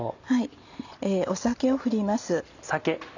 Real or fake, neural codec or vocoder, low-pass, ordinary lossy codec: real; none; 7.2 kHz; none